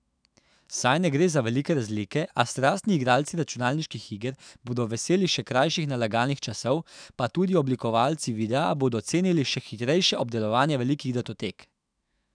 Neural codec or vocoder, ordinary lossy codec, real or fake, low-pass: autoencoder, 48 kHz, 128 numbers a frame, DAC-VAE, trained on Japanese speech; none; fake; 9.9 kHz